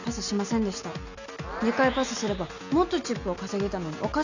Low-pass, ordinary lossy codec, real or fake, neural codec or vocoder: 7.2 kHz; none; real; none